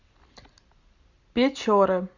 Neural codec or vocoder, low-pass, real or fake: none; 7.2 kHz; real